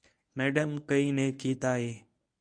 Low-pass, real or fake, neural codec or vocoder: 9.9 kHz; fake; codec, 24 kHz, 0.9 kbps, WavTokenizer, medium speech release version 1